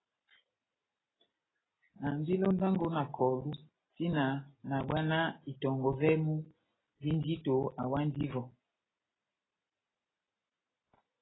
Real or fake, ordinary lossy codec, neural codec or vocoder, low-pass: real; AAC, 16 kbps; none; 7.2 kHz